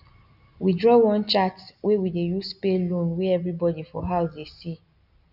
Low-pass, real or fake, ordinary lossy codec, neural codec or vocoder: 5.4 kHz; real; none; none